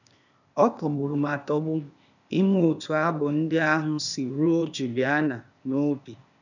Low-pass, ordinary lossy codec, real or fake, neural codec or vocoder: 7.2 kHz; none; fake; codec, 16 kHz, 0.8 kbps, ZipCodec